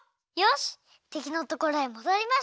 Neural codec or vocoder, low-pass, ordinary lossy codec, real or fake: none; none; none; real